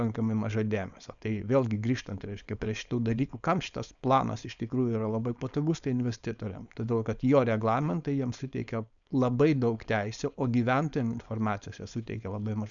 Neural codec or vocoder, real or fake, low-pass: codec, 16 kHz, 4.8 kbps, FACodec; fake; 7.2 kHz